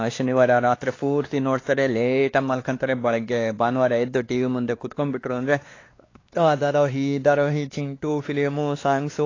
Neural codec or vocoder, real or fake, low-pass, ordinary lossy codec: codec, 16 kHz, 2 kbps, X-Codec, WavLM features, trained on Multilingual LibriSpeech; fake; 7.2 kHz; AAC, 32 kbps